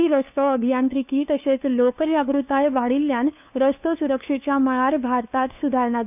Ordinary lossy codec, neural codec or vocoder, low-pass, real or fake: none; codec, 16 kHz, 2 kbps, FunCodec, trained on LibriTTS, 25 frames a second; 3.6 kHz; fake